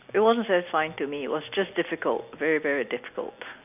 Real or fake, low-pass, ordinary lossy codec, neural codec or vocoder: real; 3.6 kHz; none; none